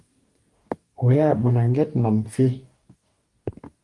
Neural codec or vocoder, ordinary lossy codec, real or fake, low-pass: codec, 44.1 kHz, 2.6 kbps, DAC; Opus, 24 kbps; fake; 10.8 kHz